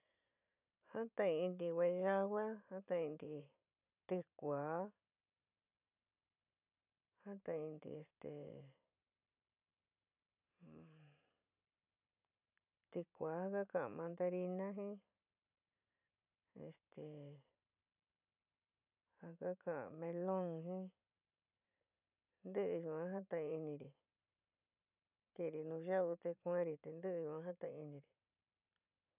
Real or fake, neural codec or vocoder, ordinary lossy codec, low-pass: fake; vocoder, 44.1 kHz, 128 mel bands every 512 samples, BigVGAN v2; none; 3.6 kHz